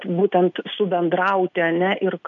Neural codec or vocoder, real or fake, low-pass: none; real; 7.2 kHz